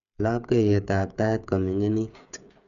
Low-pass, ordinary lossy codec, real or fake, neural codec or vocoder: 7.2 kHz; none; fake; codec, 16 kHz, 8 kbps, FreqCodec, smaller model